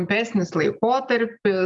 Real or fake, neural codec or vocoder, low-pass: real; none; 10.8 kHz